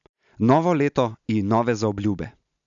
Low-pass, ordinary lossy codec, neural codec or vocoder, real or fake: 7.2 kHz; none; none; real